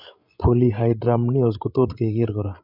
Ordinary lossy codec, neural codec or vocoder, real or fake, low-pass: none; codec, 16 kHz, 16 kbps, FreqCodec, larger model; fake; 5.4 kHz